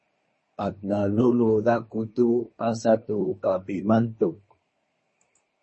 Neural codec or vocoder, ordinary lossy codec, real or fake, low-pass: codec, 24 kHz, 1 kbps, SNAC; MP3, 32 kbps; fake; 10.8 kHz